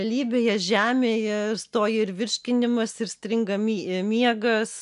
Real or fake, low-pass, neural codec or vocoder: real; 10.8 kHz; none